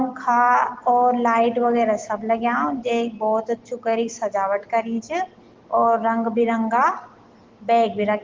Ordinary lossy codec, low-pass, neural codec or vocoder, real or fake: Opus, 16 kbps; 7.2 kHz; none; real